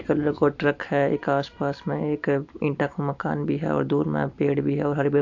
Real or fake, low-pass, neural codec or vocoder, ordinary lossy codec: real; 7.2 kHz; none; AAC, 48 kbps